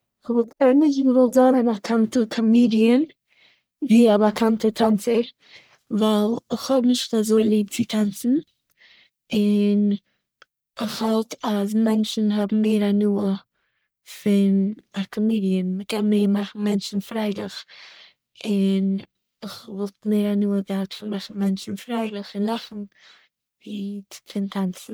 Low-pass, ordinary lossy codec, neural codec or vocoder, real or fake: none; none; codec, 44.1 kHz, 1.7 kbps, Pupu-Codec; fake